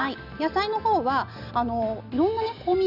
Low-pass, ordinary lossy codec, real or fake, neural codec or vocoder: 5.4 kHz; none; real; none